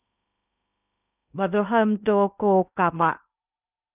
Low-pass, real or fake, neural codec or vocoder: 3.6 kHz; fake; codec, 16 kHz in and 24 kHz out, 0.8 kbps, FocalCodec, streaming, 65536 codes